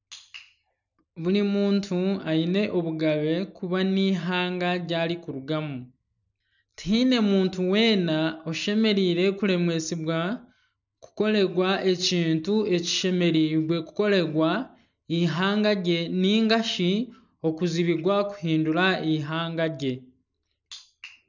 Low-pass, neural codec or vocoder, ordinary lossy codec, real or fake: 7.2 kHz; none; none; real